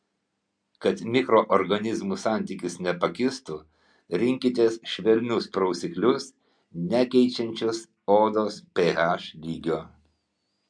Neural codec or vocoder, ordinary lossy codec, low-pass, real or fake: none; MP3, 64 kbps; 9.9 kHz; real